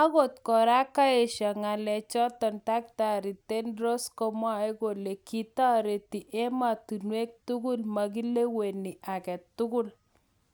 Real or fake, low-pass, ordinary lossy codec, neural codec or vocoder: real; none; none; none